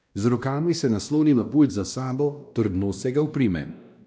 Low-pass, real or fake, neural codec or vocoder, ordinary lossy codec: none; fake; codec, 16 kHz, 1 kbps, X-Codec, WavLM features, trained on Multilingual LibriSpeech; none